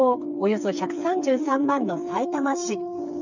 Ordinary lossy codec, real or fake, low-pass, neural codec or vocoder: none; fake; 7.2 kHz; codec, 16 kHz, 4 kbps, FreqCodec, smaller model